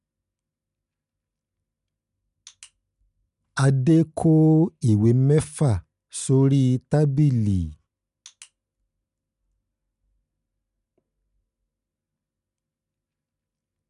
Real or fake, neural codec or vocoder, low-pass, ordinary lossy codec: real; none; 10.8 kHz; none